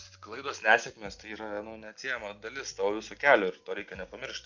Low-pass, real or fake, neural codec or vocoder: 7.2 kHz; real; none